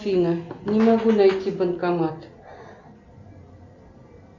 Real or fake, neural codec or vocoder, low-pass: real; none; 7.2 kHz